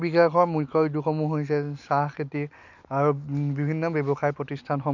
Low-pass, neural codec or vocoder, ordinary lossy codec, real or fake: 7.2 kHz; none; none; real